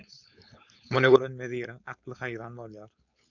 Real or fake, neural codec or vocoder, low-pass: fake; codec, 16 kHz, 4.8 kbps, FACodec; 7.2 kHz